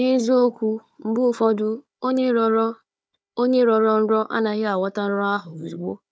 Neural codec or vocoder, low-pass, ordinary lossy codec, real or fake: codec, 16 kHz, 4 kbps, FunCodec, trained on Chinese and English, 50 frames a second; none; none; fake